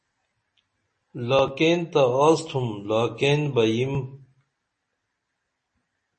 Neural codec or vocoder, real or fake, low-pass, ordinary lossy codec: none; real; 10.8 kHz; MP3, 32 kbps